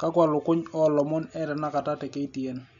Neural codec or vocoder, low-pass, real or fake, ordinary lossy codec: none; 7.2 kHz; real; none